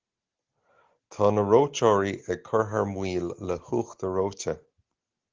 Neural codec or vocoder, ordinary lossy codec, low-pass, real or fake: none; Opus, 16 kbps; 7.2 kHz; real